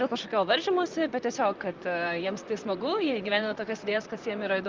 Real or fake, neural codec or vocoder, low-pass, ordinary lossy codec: fake; vocoder, 44.1 kHz, 128 mel bands, Pupu-Vocoder; 7.2 kHz; Opus, 32 kbps